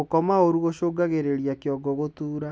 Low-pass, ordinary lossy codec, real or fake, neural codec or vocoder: none; none; real; none